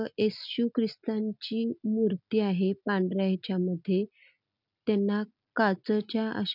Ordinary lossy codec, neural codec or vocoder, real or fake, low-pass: none; none; real; 5.4 kHz